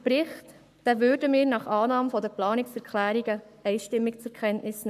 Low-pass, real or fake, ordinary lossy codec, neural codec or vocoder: 14.4 kHz; fake; none; codec, 44.1 kHz, 7.8 kbps, Pupu-Codec